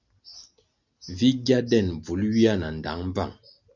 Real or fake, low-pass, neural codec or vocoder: real; 7.2 kHz; none